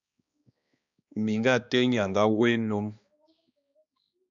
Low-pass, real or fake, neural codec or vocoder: 7.2 kHz; fake; codec, 16 kHz, 2 kbps, X-Codec, HuBERT features, trained on balanced general audio